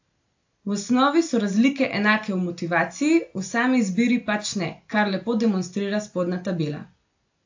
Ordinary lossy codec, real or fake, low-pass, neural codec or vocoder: AAC, 48 kbps; real; 7.2 kHz; none